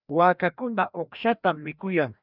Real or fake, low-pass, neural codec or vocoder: fake; 5.4 kHz; codec, 16 kHz, 1 kbps, FreqCodec, larger model